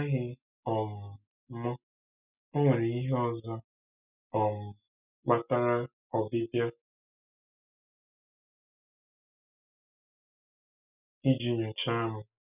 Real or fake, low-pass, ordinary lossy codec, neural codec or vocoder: real; 3.6 kHz; none; none